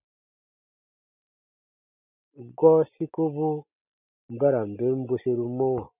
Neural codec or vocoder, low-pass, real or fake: none; 3.6 kHz; real